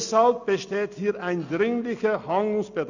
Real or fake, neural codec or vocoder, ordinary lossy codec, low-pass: real; none; none; 7.2 kHz